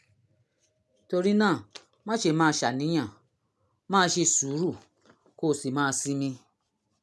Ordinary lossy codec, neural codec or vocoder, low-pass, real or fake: none; none; none; real